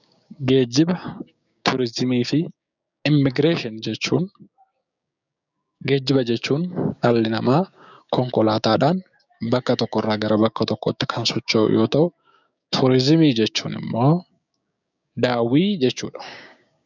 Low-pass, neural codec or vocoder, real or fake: 7.2 kHz; codec, 16 kHz, 6 kbps, DAC; fake